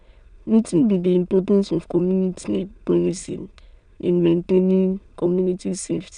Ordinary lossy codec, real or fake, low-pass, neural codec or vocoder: none; fake; 9.9 kHz; autoencoder, 22.05 kHz, a latent of 192 numbers a frame, VITS, trained on many speakers